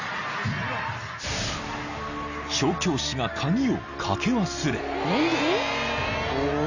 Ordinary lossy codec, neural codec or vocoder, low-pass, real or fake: none; none; 7.2 kHz; real